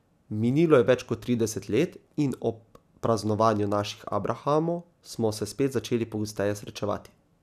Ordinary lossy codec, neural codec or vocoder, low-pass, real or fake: none; none; 14.4 kHz; real